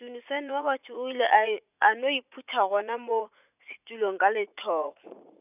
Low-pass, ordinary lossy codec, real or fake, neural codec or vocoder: 3.6 kHz; none; fake; vocoder, 44.1 kHz, 80 mel bands, Vocos